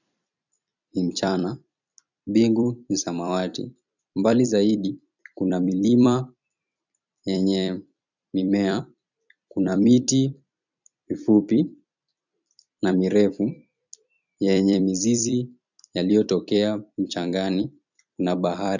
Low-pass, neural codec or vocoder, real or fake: 7.2 kHz; vocoder, 44.1 kHz, 128 mel bands every 256 samples, BigVGAN v2; fake